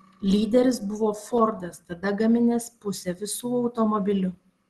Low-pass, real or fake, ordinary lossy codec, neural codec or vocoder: 10.8 kHz; real; Opus, 16 kbps; none